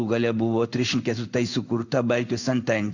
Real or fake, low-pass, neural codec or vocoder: fake; 7.2 kHz; codec, 16 kHz in and 24 kHz out, 1 kbps, XY-Tokenizer